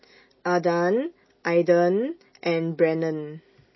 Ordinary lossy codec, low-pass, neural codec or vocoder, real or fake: MP3, 24 kbps; 7.2 kHz; none; real